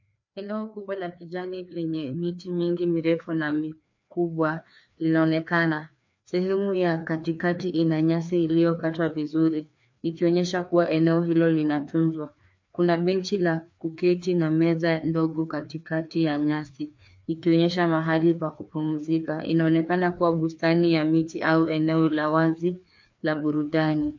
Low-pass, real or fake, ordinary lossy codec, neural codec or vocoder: 7.2 kHz; fake; MP3, 48 kbps; codec, 16 kHz, 2 kbps, FreqCodec, larger model